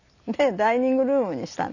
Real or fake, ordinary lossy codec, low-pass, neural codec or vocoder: real; none; 7.2 kHz; none